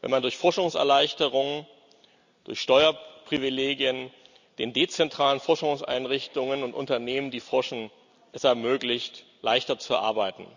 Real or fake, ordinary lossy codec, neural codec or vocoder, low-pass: real; none; none; 7.2 kHz